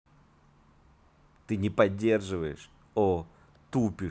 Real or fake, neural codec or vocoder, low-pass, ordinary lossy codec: real; none; none; none